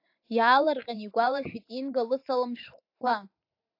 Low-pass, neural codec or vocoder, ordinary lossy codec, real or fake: 5.4 kHz; vocoder, 44.1 kHz, 128 mel bands every 512 samples, BigVGAN v2; AAC, 32 kbps; fake